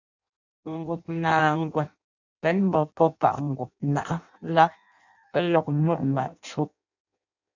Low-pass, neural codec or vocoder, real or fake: 7.2 kHz; codec, 16 kHz in and 24 kHz out, 0.6 kbps, FireRedTTS-2 codec; fake